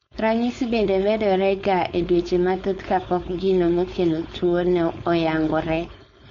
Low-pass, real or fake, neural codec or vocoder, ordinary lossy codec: 7.2 kHz; fake; codec, 16 kHz, 4.8 kbps, FACodec; MP3, 48 kbps